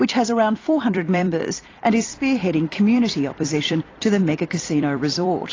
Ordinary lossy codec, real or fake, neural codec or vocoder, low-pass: AAC, 32 kbps; fake; vocoder, 44.1 kHz, 128 mel bands every 256 samples, BigVGAN v2; 7.2 kHz